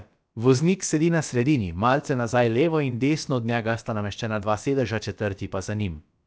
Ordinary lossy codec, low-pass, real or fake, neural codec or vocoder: none; none; fake; codec, 16 kHz, about 1 kbps, DyCAST, with the encoder's durations